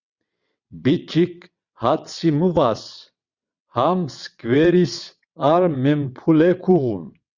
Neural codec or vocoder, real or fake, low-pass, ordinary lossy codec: vocoder, 22.05 kHz, 80 mel bands, WaveNeXt; fake; 7.2 kHz; Opus, 64 kbps